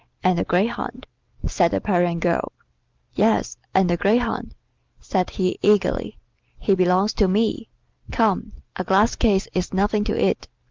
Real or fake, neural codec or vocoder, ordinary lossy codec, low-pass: real; none; Opus, 24 kbps; 7.2 kHz